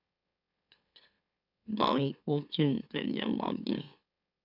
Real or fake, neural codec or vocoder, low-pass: fake; autoencoder, 44.1 kHz, a latent of 192 numbers a frame, MeloTTS; 5.4 kHz